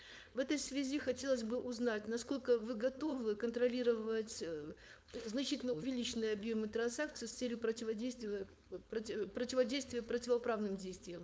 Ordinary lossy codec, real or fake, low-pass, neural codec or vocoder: none; fake; none; codec, 16 kHz, 4.8 kbps, FACodec